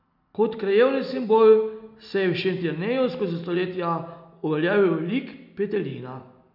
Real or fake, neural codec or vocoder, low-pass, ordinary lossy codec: real; none; 5.4 kHz; none